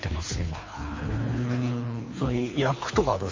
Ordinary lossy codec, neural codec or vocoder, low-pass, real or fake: MP3, 32 kbps; codec, 24 kHz, 3 kbps, HILCodec; 7.2 kHz; fake